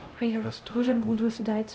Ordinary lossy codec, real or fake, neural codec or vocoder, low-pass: none; fake; codec, 16 kHz, 0.5 kbps, X-Codec, HuBERT features, trained on LibriSpeech; none